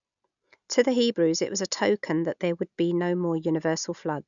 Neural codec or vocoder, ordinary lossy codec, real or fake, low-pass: none; none; real; 7.2 kHz